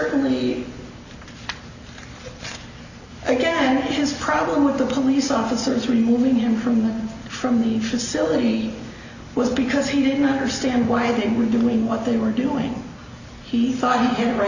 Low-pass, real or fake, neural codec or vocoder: 7.2 kHz; real; none